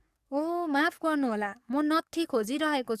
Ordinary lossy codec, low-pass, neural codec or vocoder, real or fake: Opus, 64 kbps; 14.4 kHz; codec, 44.1 kHz, 7.8 kbps, DAC; fake